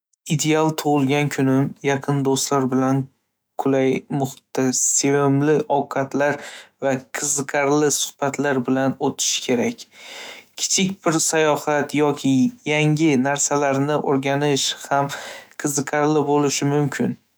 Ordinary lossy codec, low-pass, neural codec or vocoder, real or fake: none; none; autoencoder, 48 kHz, 128 numbers a frame, DAC-VAE, trained on Japanese speech; fake